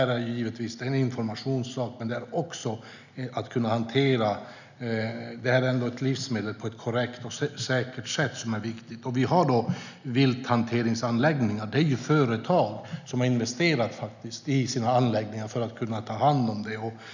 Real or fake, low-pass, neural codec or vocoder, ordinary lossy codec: real; 7.2 kHz; none; none